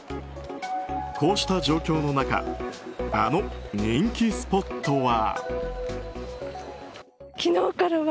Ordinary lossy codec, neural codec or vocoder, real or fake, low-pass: none; none; real; none